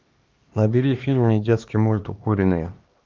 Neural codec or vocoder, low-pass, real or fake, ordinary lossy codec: codec, 16 kHz, 2 kbps, X-Codec, HuBERT features, trained on LibriSpeech; 7.2 kHz; fake; Opus, 32 kbps